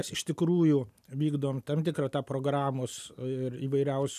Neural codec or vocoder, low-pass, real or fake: none; 14.4 kHz; real